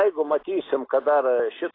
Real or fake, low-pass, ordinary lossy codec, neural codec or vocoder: real; 5.4 kHz; AAC, 24 kbps; none